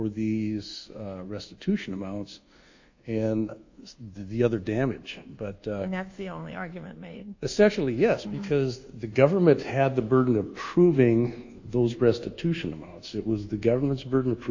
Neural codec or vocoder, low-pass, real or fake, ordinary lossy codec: codec, 24 kHz, 1.2 kbps, DualCodec; 7.2 kHz; fake; AAC, 48 kbps